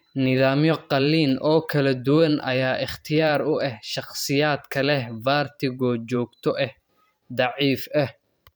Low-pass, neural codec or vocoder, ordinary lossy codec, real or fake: none; vocoder, 44.1 kHz, 128 mel bands every 512 samples, BigVGAN v2; none; fake